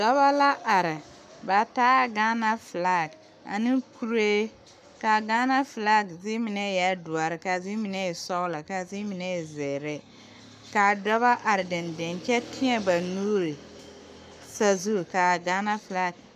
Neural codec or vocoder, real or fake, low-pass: codec, 44.1 kHz, 7.8 kbps, Pupu-Codec; fake; 14.4 kHz